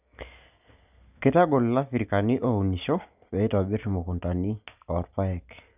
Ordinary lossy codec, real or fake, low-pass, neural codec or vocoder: none; real; 3.6 kHz; none